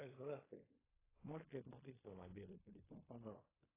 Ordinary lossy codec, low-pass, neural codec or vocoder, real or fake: AAC, 16 kbps; 3.6 kHz; codec, 16 kHz in and 24 kHz out, 0.4 kbps, LongCat-Audio-Codec, fine tuned four codebook decoder; fake